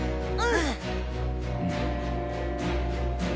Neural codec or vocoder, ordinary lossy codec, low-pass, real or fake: none; none; none; real